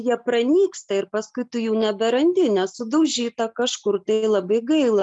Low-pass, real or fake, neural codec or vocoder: 10.8 kHz; real; none